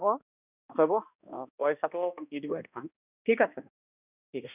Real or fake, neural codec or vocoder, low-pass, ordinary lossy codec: fake; codec, 16 kHz, 1 kbps, X-Codec, HuBERT features, trained on balanced general audio; 3.6 kHz; none